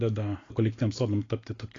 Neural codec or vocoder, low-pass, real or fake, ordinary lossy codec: none; 7.2 kHz; real; AAC, 32 kbps